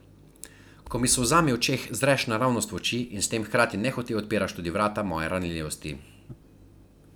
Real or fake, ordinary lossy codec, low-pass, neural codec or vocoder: real; none; none; none